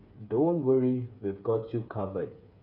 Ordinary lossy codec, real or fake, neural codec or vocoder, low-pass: none; fake; codec, 16 kHz, 8 kbps, FreqCodec, smaller model; 5.4 kHz